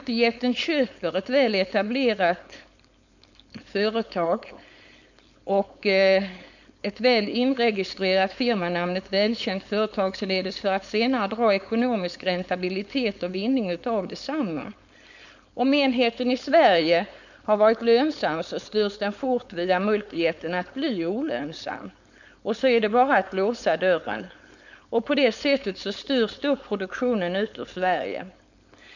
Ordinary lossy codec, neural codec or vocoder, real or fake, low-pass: none; codec, 16 kHz, 4.8 kbps, FACodec; fake; 7.2 kHz